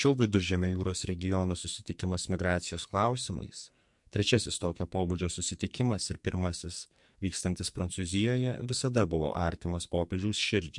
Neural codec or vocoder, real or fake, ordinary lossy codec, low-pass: codec, 44.1 kHz, 2.6 kbps, SNAC; fake; MP3, 64 kbps; 10.8 kHz